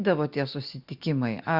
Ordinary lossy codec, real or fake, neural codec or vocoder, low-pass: Opus, 64 kbps; real; none; 5.4 kHz